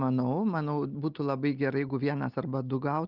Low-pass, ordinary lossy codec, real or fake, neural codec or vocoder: 5.4 kHz; Opus, 24 kbps; real; none